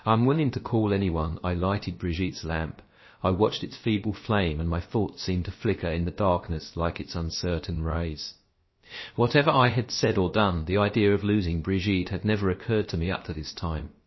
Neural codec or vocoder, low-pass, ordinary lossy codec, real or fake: codec, 16 kHz, about 1 kbps, DyCAST, with the encoder's durations; 7.2 kHz; MP3, 24 kbps; fake